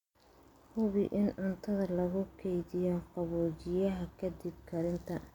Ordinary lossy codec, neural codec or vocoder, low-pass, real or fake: MP3, 96 kbps; none; 19.8 kHz; real